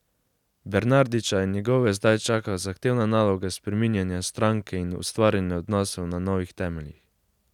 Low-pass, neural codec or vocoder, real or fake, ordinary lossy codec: 19.8 kHz; none; real; none